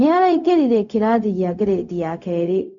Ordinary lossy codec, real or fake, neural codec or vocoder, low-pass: none; fake; codec, 16 kHz, 0.4 kbps, LongCat-Audio-Codec; 7.2 kHz